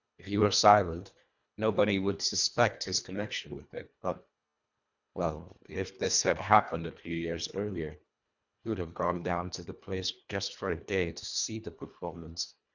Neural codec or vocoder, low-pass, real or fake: codec, 24 kHz, 1.5 kbps, HILCodec; 7.2 kHz; fake